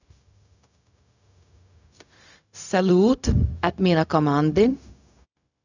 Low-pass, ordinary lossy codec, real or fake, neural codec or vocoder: 7.2 kHz; none; fake; codec, 16 kHz, 0.4 kbps, LongCat-Audio-Codec